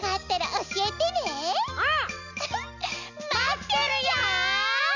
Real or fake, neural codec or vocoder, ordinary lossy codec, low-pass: real; none; none; 7.2 kHz